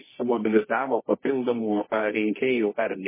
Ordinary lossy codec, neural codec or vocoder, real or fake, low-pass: MP3, 16 kbps; codec, 24 kHz, 0.9 kbps, WavTokenizer, medium music audio release; fake; 3.6 kHz